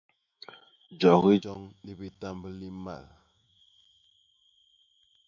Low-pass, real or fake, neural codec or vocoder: 7.2 kHz; fake; autoencoder, 48 kHz, 128 numbers a frame, DAC-VAE, trained on Japanese speech